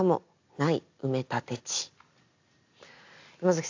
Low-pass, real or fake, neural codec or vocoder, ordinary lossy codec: 7.2 kHz; real; none; AAC, 48 kbps